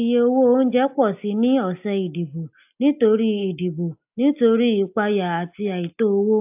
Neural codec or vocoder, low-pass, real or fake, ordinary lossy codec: none; 3.6 kHz; real; AAC, 32 kbps